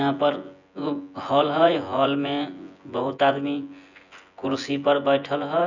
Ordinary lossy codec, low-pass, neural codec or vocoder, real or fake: none; 7.2 kHz; vocoder, 24 kHz, 100 mel bands, Vocos; fake